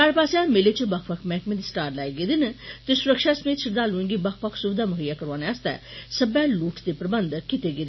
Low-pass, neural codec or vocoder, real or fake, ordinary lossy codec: 7.2 kHz; none; real; MP3, 24 kbps